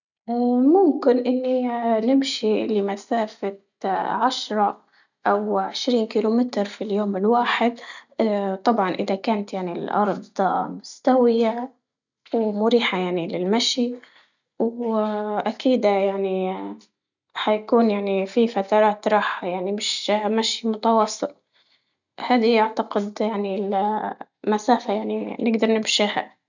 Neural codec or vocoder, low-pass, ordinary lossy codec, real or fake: none; 7.2 kHz; none; real